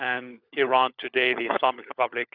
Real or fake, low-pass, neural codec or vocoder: fake; 5.4 kHz; codec, 16 kHz, 16 kbps, FunCodec, trained on LibriTTS, 50 frames a second